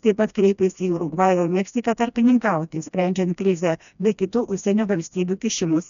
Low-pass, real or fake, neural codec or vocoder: 7.2 kHz; fake; codec, 16 kHz, 1 kbps, FreqCodec, smaller model